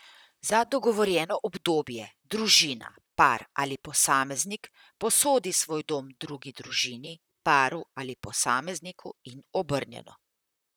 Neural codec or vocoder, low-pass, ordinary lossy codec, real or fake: vocoder, 44.1 kHz, 128 mel bands, Pupu-Vocoder; none; none; fake